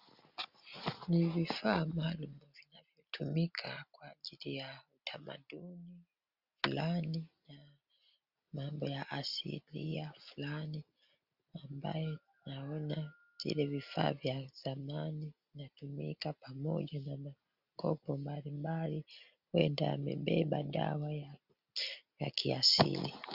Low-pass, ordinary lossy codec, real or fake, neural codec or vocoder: 5.4 kHz; Opus, 64 kbps; real; none